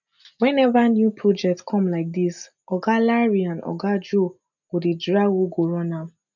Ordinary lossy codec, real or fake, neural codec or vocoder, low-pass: none; real; none; 7.2 kHz